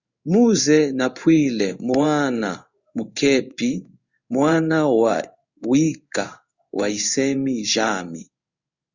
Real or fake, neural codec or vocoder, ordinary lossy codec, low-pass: fake; codec, 16 kHz in and 24 kHz out, 1 kbps, XY-Tokenizer; Opus, 64 kbps; 7.2 kHz